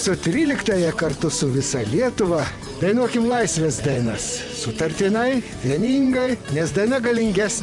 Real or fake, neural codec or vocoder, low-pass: fake; vocoder, 44.1 kHz, 128 mel bands, Pupu-Vocoder; 10.8 kHz